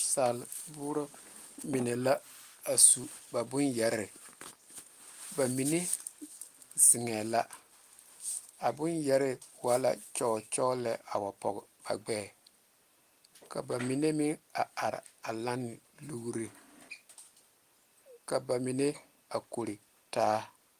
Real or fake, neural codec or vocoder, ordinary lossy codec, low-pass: real; none; Opus, 24 kbps; 14.4 kHz